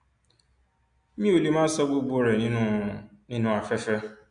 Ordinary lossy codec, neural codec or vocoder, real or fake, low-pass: AAC, 64 kbps; none; real; 9.9 kHz